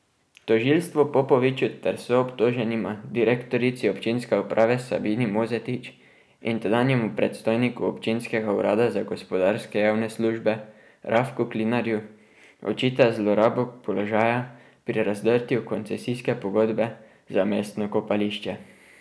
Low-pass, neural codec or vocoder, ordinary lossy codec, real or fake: none; none; none; real